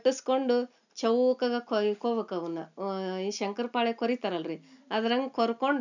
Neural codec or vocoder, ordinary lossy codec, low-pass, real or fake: none; none; 7.2 kHz; real